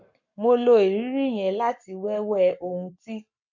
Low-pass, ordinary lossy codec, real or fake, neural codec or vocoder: 7.2 kHz; none; fake; codec, 44.1 kHz, 7.8 kbps, Pupu-Codec